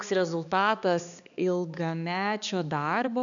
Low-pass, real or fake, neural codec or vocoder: 7.2 kHz; fake; codec, 16 kHz, 2 kbps, X-Codec, HuBERT features, trained on balanced general audio